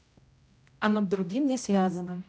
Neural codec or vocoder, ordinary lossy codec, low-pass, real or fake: codec, 16 kHz, 0.5 kbps, X-Codec, HuBERT features, trained on general audio; none; none; fake